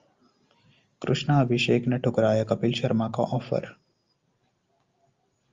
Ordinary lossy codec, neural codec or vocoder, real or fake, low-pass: Opus, 32 kbps; none; real; 7.2 kHz